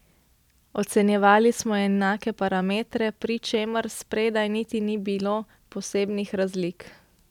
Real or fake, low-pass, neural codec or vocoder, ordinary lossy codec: real; 19.8 kHz; none; none